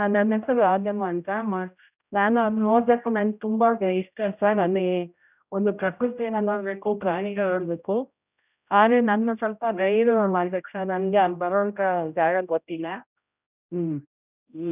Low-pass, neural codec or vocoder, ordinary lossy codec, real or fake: 3.6 kHz; codec, 16 kHz, 0.5 kbps, X-Codec, HuBERT features, trained on general audio; none; fake